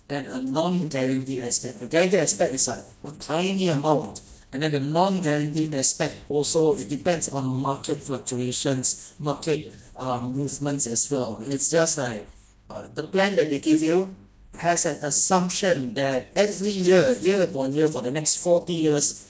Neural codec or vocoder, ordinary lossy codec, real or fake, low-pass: codec, 16 kHz, 1 kbps, FreqCodec, smaller model; none; fake; none